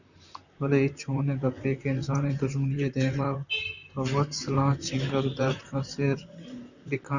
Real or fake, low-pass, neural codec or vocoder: fake; 7.2 kHz; vocoder, 44.1 kHz, 128 mel bands, Pupu-Vocoder